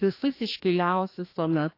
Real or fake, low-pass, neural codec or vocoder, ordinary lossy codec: fake; 5.4 kHz; codec, 16 kHz, 1 kbps, FreqCodec, larger model; AAC, 32 kbps